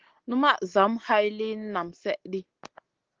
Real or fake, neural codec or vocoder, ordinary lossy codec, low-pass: real; none; Opus, 16 kbps; 7.2 kHz